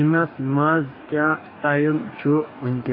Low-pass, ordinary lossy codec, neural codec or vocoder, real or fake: 5.4 kHz; AAC, 24 kbps; codec, 44.1 kHz, 2.6 kbps, DAC; fake